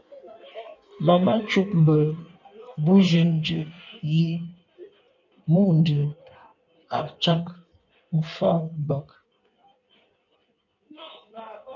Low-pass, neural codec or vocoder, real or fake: 7.2 kHz; codec, 16 kHz in and 24 kHz out, 1.1 kbps, FireRedTTS-2 codec; fake